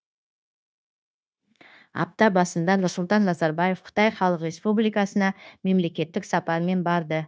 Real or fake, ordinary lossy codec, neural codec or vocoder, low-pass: fake; none; codec, 16 kHz, 0.9 kbps, LongCat-Audio-Codec; none